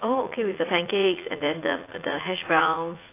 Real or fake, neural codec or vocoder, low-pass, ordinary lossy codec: fake; vocoder, 44.1 kHz, 80 mel bands, Vocos; 3.6 kHz; AAC, 24 kbps